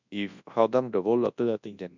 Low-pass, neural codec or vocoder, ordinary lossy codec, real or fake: 7.2 kHz; codec, 24 kHz, 0.9 kbps, WavTokenizer, large speech release; none; fake